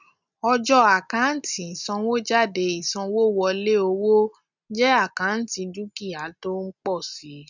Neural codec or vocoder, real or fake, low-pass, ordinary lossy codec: none; real; 7.2 kHz; none